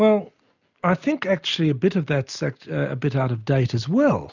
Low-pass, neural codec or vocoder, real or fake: 7.2 kHz; none; real